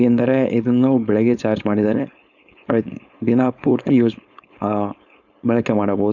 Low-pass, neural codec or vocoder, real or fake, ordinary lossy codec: 7.2 kHz; codec, 16 kHz, 4.8 kbps, FACodec; fake; none